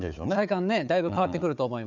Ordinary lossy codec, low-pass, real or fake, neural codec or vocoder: none; 7.2 kHz; fake; codec, 16 kHz, 4 kbps, FunCodec, trained on Chinese and English, 50 frames a second